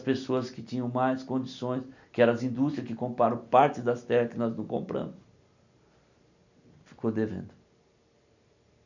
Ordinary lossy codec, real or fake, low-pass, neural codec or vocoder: none; real; 7.2 kHz; none